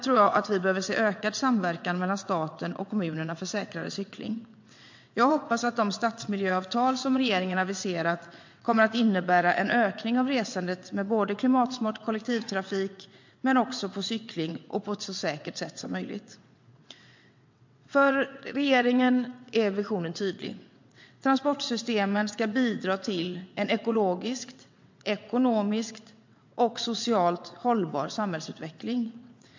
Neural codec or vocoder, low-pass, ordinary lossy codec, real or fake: vocoder, 22.05 kHz, 80 mel bands, WaveNeXt; 7.2 kHz; MP3, 48 kbps; fake